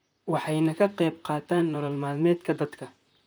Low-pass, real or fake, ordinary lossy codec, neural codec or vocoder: none; fake; none; vocoder, 44.1 kHz, 128 mel bands, Pupu-Vocoder